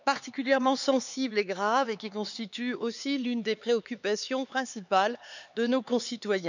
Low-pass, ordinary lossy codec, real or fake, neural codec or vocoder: 7.2 kHz; none; fake; codec, 16 kHz, 4 kbps, X-Codec, HuBERT features, trained on LibriSpeech